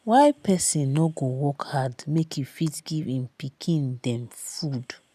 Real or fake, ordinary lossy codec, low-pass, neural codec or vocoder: real; none; 14.4 kHz; none